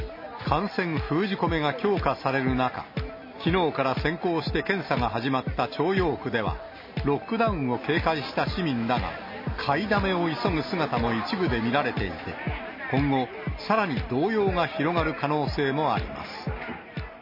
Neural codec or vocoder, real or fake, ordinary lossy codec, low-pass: none; real; MP3, 24 kbps; 5.4 kHz